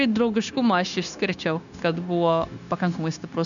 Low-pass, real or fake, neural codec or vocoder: 7.2 kHz; fake; codec, 16 kHz, 0.9 kbps, LongCat-Audio-Codec